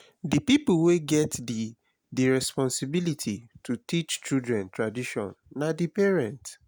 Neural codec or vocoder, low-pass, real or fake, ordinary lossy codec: none; none; real; none